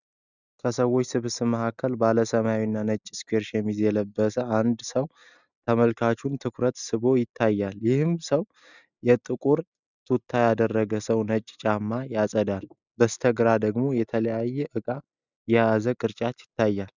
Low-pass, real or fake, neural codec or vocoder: 7.2 kHz; real; none